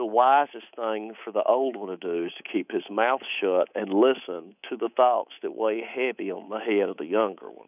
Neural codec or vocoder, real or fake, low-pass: codec, 24 kHz, 3.1 kbps, DualCodec; fake; 3.6 kHz